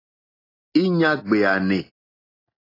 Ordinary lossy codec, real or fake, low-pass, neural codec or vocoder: AAC, 24 kbps; real; 5.4 kHz; none